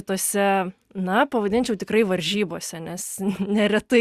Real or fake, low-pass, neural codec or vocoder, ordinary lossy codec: real; 14.4 kHz; none; Opus, 64 kbps